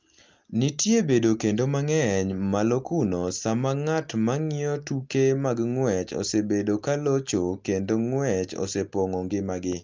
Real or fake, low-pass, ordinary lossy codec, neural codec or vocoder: real; 7.2 kHz; Opus, 32 kbps; none